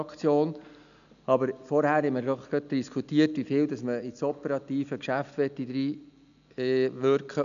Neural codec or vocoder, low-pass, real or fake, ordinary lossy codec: none; 7.2 kHz; real; none